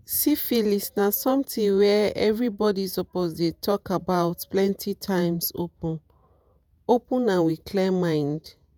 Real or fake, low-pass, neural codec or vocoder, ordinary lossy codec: fake; none; vocoder, 48 kHz, 128 mel bands, Vocos; none